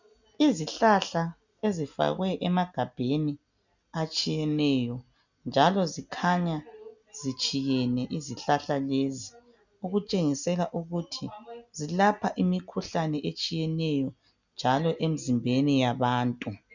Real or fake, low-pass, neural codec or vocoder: real; 7.2 kHz; none